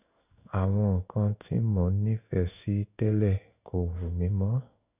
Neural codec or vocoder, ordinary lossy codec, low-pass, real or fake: codec, 16 kHz in and 24 kHz out, 1 kbps, XY-Tokenizer; none; 3.6 kHz; fake